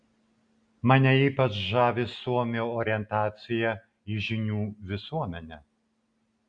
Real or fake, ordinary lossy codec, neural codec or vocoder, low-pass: real; AAC, 64 kbps; none; 9.9 kHz